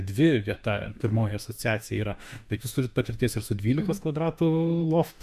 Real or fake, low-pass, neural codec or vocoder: fake; 14.4 kHz; autoencoder, 48 kHz, 32 numbers a frame, DAC-VAE, trained on Japanese speech